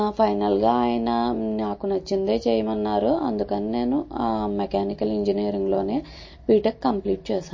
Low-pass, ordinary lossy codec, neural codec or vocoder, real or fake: 7.2 kHz; MP3, 32 kbps; none; real